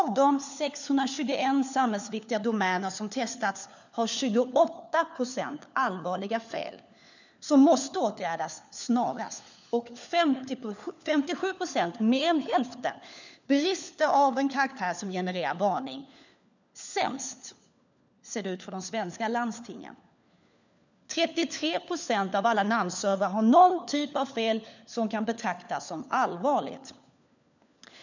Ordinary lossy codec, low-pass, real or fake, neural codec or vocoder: none; 7.2 kHz; fake; codec, 16 kHz, 4 kbps, FunCodec, trained on LibriTTS, 50 frames a second